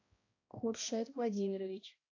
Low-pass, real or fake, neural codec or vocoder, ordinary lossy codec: 7.2 kHz; fake; codec, 16 kHz, 1 kbps, X-Codec, HuBERT features, trained on balanced general audio; AAC, 32 kbps